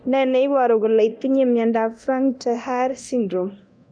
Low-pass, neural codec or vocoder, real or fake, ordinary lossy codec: 9.9 kHz; codec, 24 kHz, 0.9 kbps, DualCodec; fake; none